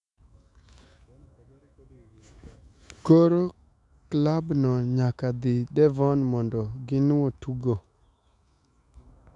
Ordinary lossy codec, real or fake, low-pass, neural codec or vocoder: none; fake; 10.8 kHz; autoencoder, 48 kHz, 128 numbers a frame, DAC-VAE, trained on Japanese speech